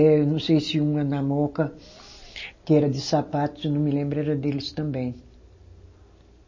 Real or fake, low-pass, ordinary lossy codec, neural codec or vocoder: real; 7.2 kHz; MP3, 32 kbps; none